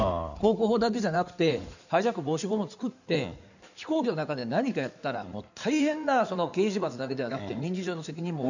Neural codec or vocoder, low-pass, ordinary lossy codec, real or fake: codec, 16 kHz in and 24 kHz out, 2.2 kbps, FireRedTTS-2 codec; 7.2 kHz; none; fake